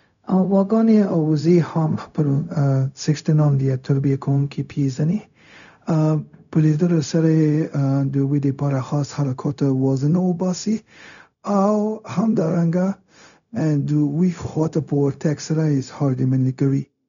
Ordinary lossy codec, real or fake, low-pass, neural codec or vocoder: none; fake; 7.2 kHz; codec, 16 kHz, 0.4 kbps, LongCat-Audio-Codec